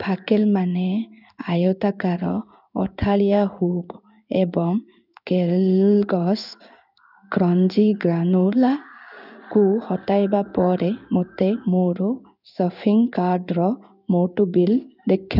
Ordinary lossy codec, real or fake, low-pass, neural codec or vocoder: none; fake; 5.4 kHz; codec, 16 kHz in and 24 kHz out, 1 kbps, XY-Tokenizer